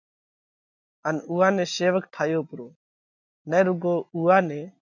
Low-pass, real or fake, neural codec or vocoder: 7.2 kHz; real; none